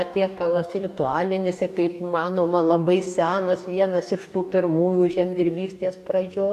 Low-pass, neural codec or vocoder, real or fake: 14.4 kHz; codec, 44.1 kHz, 2.6 kbps, DAC; fake